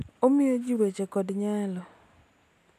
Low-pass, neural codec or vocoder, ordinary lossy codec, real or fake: 14.4 kHz; none; none; real